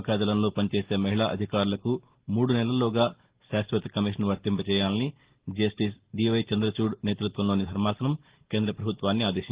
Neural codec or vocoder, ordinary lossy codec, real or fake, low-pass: none; Opus, 16 kbps; real; 3.6 kHz